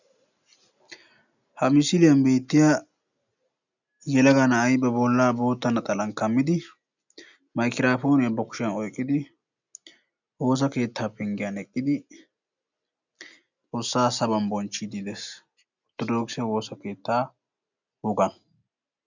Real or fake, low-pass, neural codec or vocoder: real; 7.2 kHz; none